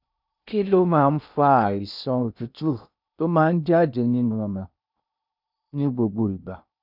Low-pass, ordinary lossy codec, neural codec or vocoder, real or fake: 5.4 kHz; none; codec, 16 kHz in and 24 kHz out, 0.8 kbps, FocalCodec, streaming, 65536 codes; fake